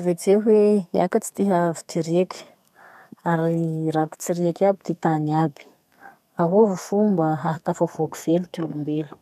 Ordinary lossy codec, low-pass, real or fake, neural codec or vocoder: none; 14.4 kHz; fake; codec, 32 kHz, 1.9 kbps, SNAC